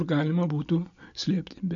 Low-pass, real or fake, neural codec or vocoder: 7.2 kHz; real; none